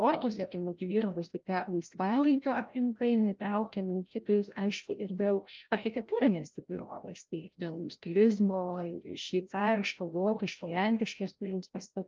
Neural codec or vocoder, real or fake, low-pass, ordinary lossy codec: codec, 16 kHz, 0.5 kbps, FreqCodec, larger model; fake; 7.2 kHz; Opus, 24 kbps